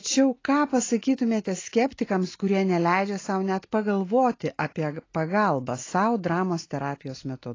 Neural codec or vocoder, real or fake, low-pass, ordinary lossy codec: none; real; 7.2 kHz; AAC, 32 kbps